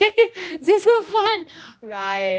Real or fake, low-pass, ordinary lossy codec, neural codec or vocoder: fake; none; none; codec, 16 kHz, 1 kbps, X-Codec, HuBERT features, trained on general audio